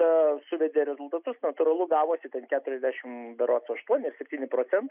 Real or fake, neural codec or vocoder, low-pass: real; none; 3.6 kHz